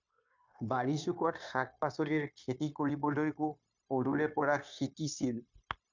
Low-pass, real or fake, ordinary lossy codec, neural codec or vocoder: 7.2 kHz; fake; MP3, 64 kbps; codec, 16 kHz, 0.9 kbps, LongCat-Audio-Codec